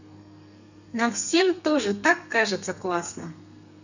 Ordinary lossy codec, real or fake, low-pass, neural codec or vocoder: none; fake; 7.2 kHz; codec, 32 kHz, 1.9 kbps, SNAC